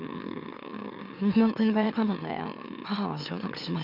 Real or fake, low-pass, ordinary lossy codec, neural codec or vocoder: fake; 5.4 kHz; none; autoencoder, 44.1 kHz, a latent of 192 numbers a frame, MeloTTS